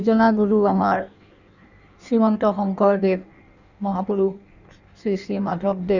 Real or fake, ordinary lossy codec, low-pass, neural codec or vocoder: fake; none; 7.2 kHz; codec, 16 kHz in and 24 kHz out, 1.1 kbps, FireRedTTS-2 codec